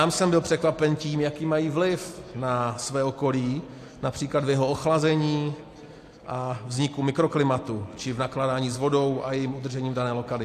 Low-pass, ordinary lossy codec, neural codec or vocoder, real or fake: 14.4 kHz; AAC, 64 kbps; none; real